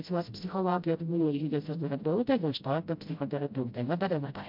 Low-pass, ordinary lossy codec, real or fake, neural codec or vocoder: 5.4 kHz; MP3, 48 kbps; fake; codec, 16 kHz, 0.5 kbps, FreqCodec, smaller model